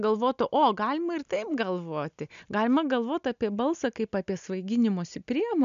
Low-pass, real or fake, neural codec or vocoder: 7.2 kHz; real; none